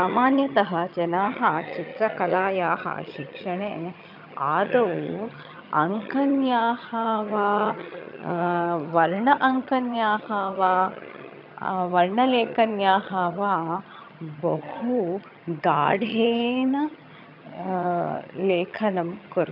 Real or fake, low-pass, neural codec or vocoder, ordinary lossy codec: fake; 5.4 kHz; vocoder, 22.05 kHz, 80 mel bands, HiFi-GAN; none